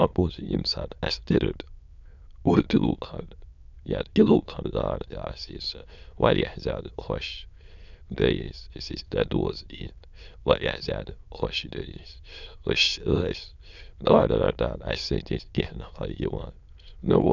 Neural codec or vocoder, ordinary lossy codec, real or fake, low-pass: autoencoder, 22.05 kHz, a latent of 192 numbers a frame, VITS, trained on many speakers; none; fake; 7.2 kHz